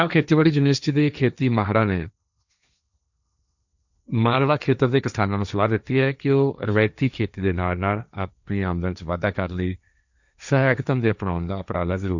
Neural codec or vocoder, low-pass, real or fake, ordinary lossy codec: codec, 16 kHz, 1.1 kbps, Voila-Tokenizer; 7.2 kHz; fake; none